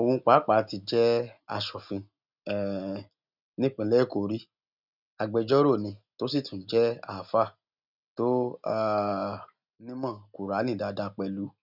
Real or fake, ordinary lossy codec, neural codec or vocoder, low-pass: real; none; none; 5.4 kHz